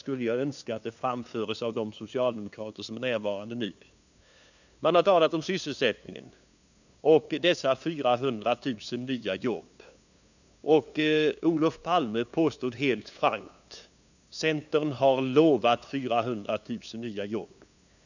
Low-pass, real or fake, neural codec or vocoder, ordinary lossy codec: 7.2 kHz; fake; codec, 16 kHz, 2 kbps, FunCodec, trained on LibriTTS, 25 frames a second; none